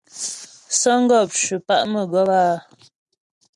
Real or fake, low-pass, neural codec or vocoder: real; 10.8 kHz; none